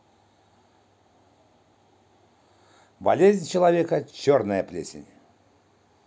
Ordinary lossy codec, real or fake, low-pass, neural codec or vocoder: none; real; none; none